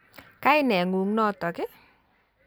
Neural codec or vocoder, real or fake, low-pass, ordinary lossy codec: none; real; none; none